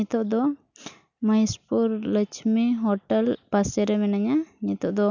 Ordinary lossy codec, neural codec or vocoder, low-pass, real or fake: none; none; 7.2 kHz; real